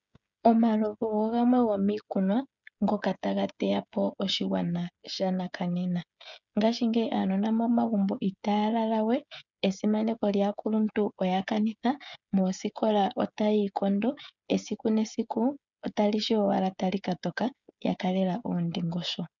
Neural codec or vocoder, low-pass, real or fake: codec, 16 kHz, 16 kbps, FreqCodec, smaller model; 7.2 kHz; fake